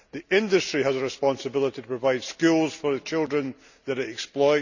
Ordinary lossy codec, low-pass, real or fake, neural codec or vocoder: none; 7.2 kHz; real; none